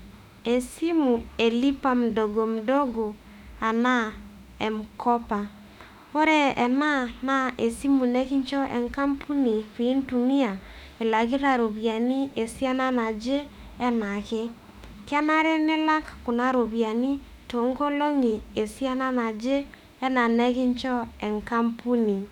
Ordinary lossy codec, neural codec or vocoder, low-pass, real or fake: none; autoencoder, 48 kHz, 32 numbers a frame, DAC-VAE, trained on Japanese speech; 19.8 kHz; fake